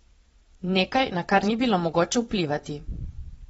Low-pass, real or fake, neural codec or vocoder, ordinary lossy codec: 9.9 kHz; fake; vocoder, 22.05 kHz, 80 mel bands, Vocos; AAC, 24 kbps